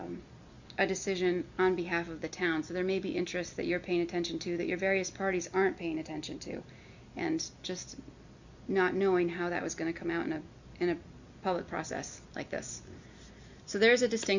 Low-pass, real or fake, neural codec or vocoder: 7.2 kHz; real; none